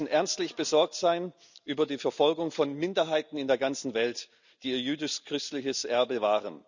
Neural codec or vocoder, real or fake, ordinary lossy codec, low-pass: none; real; none; 7.2 kHz